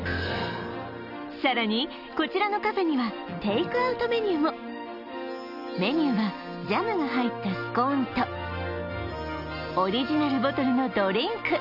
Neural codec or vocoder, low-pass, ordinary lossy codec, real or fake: none; 5.4 kHz; none; real